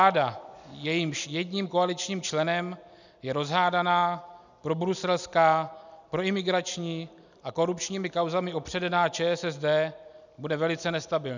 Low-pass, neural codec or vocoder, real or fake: 7.2 kHz; none; real